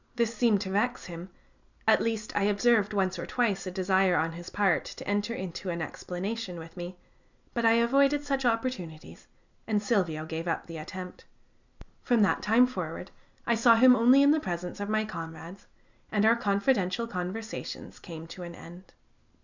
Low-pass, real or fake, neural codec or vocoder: 7.2 kHz; real; none